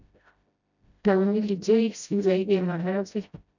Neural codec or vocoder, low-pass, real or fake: codec, 16 kHz, 0.5 kbps, FreqCodec, smaller model; 7.2 kHz; fake